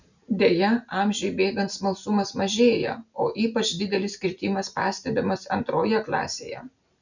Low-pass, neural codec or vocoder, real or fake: 7.2 kHz; none; real